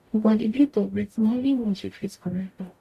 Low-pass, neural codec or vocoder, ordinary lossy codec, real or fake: 14.4 kHz; codec, 44.1 kHz, 0.9 kbps, DAC; AAC, 96 kbps; fake